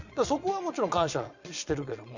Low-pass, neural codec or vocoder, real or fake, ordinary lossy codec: 7.2 kHz; vocoder, 22.05 kHz, 80 mel bands, Vocos; fake; none